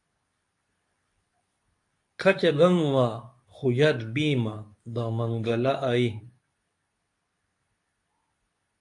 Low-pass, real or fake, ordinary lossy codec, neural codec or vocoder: 10.8 kHz; fake; AAC, 64 kbps; codec, 24 kHz, 0.9 kbps, WavTokenizer, medium speech release version 2